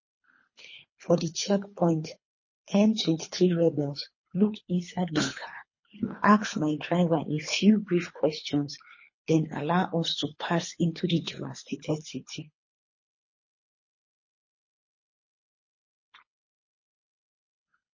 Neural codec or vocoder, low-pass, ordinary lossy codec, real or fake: codec, 24 kHz, 3 kbps, HILCodec; 7.2 kHz; MP3, 32 kbps; fake